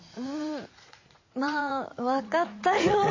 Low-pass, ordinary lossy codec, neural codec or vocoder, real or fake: 7.2 kHz; MP3, 32 kbps; vocoder, 44.1 kHz, 80 mel bands, Vocos; fake